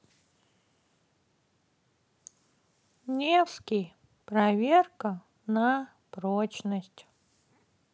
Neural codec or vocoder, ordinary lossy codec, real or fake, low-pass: none; none; real; none